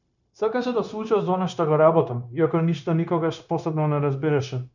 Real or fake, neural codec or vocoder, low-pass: fake; codec, 16 kHz, 0.9 kbps, LongCat-Audio-Codec; 7.2 kHz